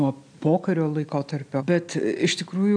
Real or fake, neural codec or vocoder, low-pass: real; none; 9.9 kHz